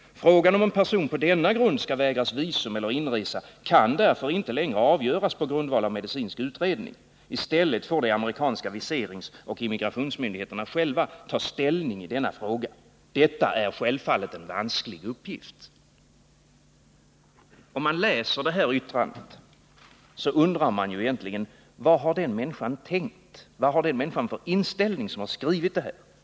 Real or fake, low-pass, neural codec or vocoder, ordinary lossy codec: real; none; none; none